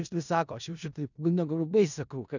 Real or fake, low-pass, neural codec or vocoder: fake; 7.2 kHz; codec, 16 kHz in and 24 kHz out, 0.4 kbps, LongCat-Audio-Codec, four codebook decoder